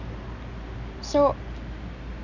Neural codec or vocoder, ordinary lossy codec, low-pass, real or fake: none; none; 7.2 kHz; real